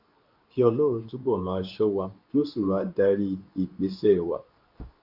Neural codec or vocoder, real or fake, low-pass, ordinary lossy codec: codec, 24 kHz, 0.9 kbps, WavTokenizer, medium speech release version 2; fake; 5.4 kHz; none